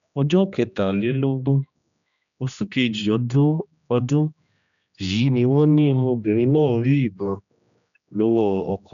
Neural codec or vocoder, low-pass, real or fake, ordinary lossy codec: codec, 16 kHz, 1 kbps, X-Codec, HuBERT features, trained on general audio; 7.2 kHz; fake; none